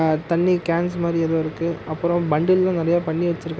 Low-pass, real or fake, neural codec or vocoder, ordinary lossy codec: none; real; none; none